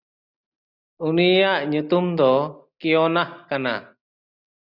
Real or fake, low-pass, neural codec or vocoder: real; 5.4 kHz; none